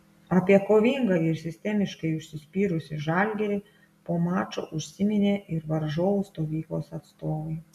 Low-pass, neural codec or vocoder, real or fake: 14.4 kHz; none; real